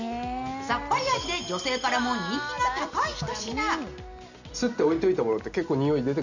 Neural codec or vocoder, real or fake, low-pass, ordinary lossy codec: none; real; 7.2 kHz; none